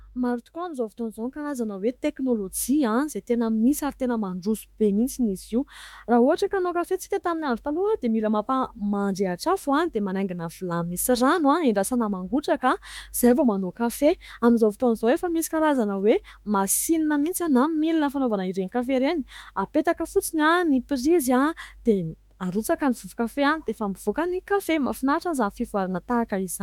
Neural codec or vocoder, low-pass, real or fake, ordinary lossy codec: autoencoder, 48 kHz, 32 numbers a frame, DAC-VAE, trained on Japanese speech; 19.8 kHz; fake; MP3, 96 kbps